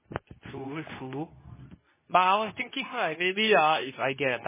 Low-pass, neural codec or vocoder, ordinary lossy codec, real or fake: 3.6 kHz; codec, 24 kHz, 0.9 kbps, WavTokenizer, medium speech release version 2; MP3, 16 kbps; fake